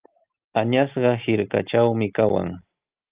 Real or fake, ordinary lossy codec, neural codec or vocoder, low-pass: real; Opus, 24 kbps; none; 3.6 kHz